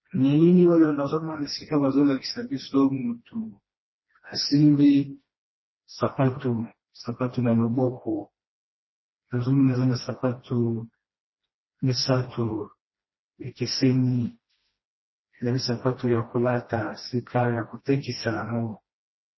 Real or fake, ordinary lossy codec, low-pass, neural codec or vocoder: fake; MP3, 24 kbps; 7.2 kHz; codec, 16 kHz, 1 kbps, FreqCodec, smaller model